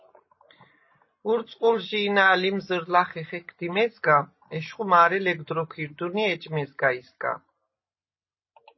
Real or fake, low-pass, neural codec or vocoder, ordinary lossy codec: real; 7.2 kHz; none; MP3, 24 kbps